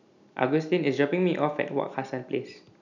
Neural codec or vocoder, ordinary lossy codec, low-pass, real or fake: none; none; 7.2 kHz; real